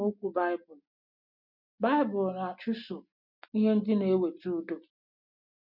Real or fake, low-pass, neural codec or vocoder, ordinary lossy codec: real; 5.4 kHz; none; none